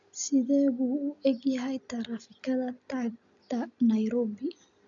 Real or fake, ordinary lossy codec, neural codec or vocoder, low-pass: real; none; none; 7.2 kHz